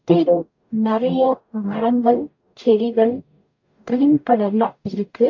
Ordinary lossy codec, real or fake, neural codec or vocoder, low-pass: AAC, 48 kbps; fake; codec, 44.1 kHz, 0.9 kbps, DAC; 7.2 kHz